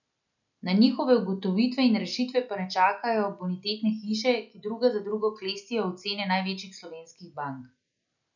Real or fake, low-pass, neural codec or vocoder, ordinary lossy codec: real; 7.2 kHz; none; none